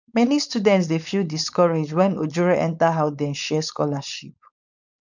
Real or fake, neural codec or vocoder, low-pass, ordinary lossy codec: fake; codec, 16 kHz, 4.8 kbps, FACodec; 7.2 kHz; none